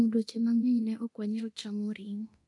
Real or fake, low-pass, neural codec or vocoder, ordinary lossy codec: fake; 10.8 kHz; codec, 24 kHz, 0.9 kbps, DualCodec; AAC, 48 kbps